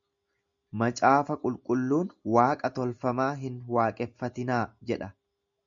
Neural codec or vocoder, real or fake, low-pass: none; real; 7.2 kHz